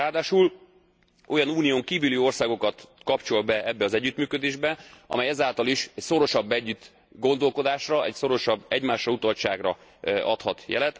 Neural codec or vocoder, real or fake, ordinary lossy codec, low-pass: none; real; none; none